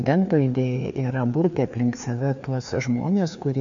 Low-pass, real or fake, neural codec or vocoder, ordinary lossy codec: 7.2 kHz; fake; codec, 16 kHz, 2 kbps, FreqCodec, larger model; MP3, 64 kbps